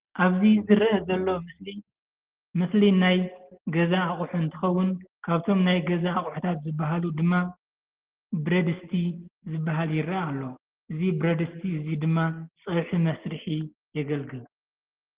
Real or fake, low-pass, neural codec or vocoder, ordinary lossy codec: real; 3.6 kHz; none; Opus, 16 kbps